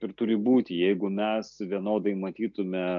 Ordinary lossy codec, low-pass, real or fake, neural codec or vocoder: AAC, 64 kbps; 7.2 kHz; real; none